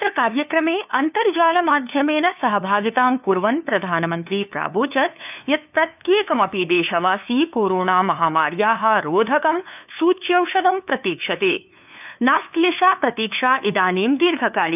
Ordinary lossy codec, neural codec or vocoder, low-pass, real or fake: none; codec, 16 kHz, 2 kbps, FunCodec, trained on LibriTTS, 25 frames a second; 3.6 kHz; fake